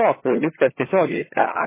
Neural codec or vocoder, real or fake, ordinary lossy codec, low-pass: vocoder, 22.05 kHz, 80 mel bands, HiFi-GAN; fake; MP3, 16 kbps; 3.6 kHz